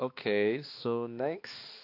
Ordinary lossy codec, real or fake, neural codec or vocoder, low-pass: AAC, 32 kbps; fake; codec, 16 kHz, 2 kbps, X-Codec, HuBERT features, trained on balanced general audio; 5.4 kHz